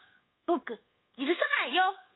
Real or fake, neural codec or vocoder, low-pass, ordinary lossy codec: fake; codec, 24 kHz, 1.2 kbps, DualCodec; 7.2 kHz; AAC, 16 kbps